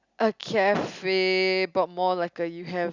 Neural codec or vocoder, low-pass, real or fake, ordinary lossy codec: none; 7.2 kHz; real; Opus, 64 kbps